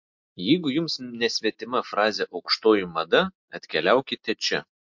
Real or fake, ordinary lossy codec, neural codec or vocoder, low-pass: real; MP3, 48 kbps; none; 7.2 kHz